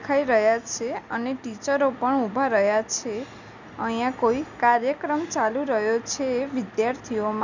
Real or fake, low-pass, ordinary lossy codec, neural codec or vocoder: real; 7.2 kHz; none; none